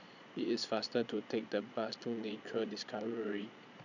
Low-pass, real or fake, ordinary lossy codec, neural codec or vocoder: 7.2 kHz; fake; none; vocoder, 22.05 kHz, 80 mel bands, Vocos